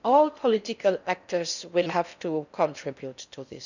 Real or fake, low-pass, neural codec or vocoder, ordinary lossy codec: fake; 7.2 kHz; codec, 16 kHz in and 24 kHz out, 0.6 kbps, FocalCodec, streaming, 4096 codes; none